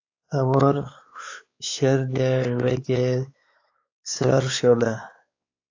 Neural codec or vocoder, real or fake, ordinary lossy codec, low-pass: codec, 16 kHz, 4 kbps, X-Codec, WavLM features, trained on Multilingual LibriSpeech; fake; AAC, 48 kbps; 7.2 kHz